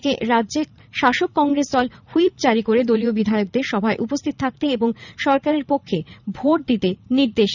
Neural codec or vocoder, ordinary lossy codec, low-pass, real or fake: vocoder, 44.1 kHz, 80 mel bands, Vocos; none; 7.2 kHz; fake